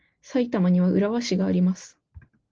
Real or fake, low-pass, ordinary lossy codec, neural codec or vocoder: real; 7.2 kHz; Opus, 16 kbps; none